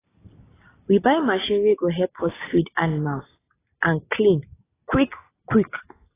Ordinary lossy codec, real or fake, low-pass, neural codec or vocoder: AAC, 16 kbps; real; 3.6 kHz; none